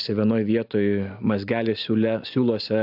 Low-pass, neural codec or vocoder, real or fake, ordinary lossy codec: 5.4 kHz; none; real; AAC, 48 kbps